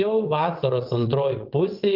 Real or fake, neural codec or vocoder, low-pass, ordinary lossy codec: fake; vocoder, 22.05 kHz, 80 mel bands, WaveNeXt; 5.4 kHz; Opus, 32 kbps